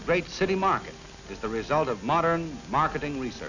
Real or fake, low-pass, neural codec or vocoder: real; 7.2 kHz; none